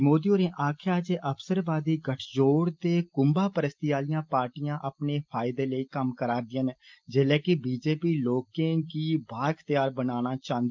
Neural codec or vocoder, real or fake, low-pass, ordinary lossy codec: none; real; 7.2 kHz; Opus, 24 kbps